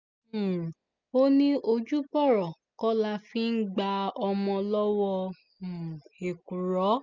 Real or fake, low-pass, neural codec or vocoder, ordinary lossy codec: real; 7.2 kHz; none; none